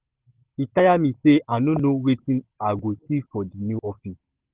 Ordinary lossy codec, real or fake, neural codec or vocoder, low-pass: Opus, 16 kbps; real; none; 3.6 kHz